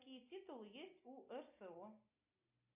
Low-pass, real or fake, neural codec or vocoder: 3.6 kHz; real; none